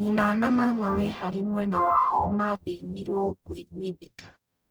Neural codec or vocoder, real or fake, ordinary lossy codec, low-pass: codec, 44.1 kHz, 0.9 kbps, DAC; fake; none; none